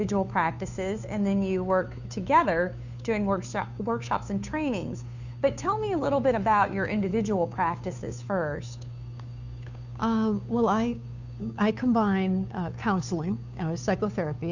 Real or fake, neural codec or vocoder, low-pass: fake; codec, 16 kHz, 2 kbps, FunCodec, trained on Chinese and English, 25 frames a second; 7.2 kHz